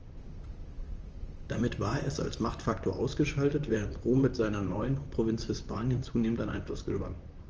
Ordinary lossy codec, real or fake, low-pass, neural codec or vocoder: Opus, 24 kbps; fake; 7.2 kHz; vocoder, 44.1 kHz, 128 mel bands, Pupu-Vocoder